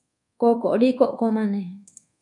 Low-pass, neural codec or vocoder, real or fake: 10.8 kHz; codec, 24 kHz, 1.2 kbps, DualCodec; fake